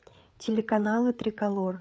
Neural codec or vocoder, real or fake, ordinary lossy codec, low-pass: codec, 16 kHz, 8 kbps, FreqCodec, larger model; fake; none; none